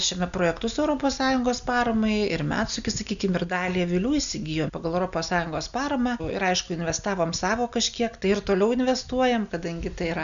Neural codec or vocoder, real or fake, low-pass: none; real; 7.2 kHz